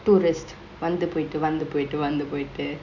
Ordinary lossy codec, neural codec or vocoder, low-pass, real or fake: none; none; 7.2 kHz; real